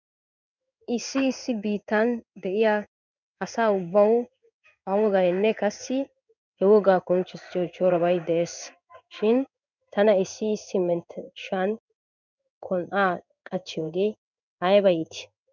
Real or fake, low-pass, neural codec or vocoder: fake; 7.2 kHz; codec, 16 kHz in and 24 kHz out, 1 kbps, XY-Tokenizer